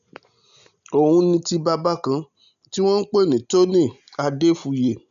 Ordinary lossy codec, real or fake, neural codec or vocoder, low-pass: none; real; none; 7.2 kHz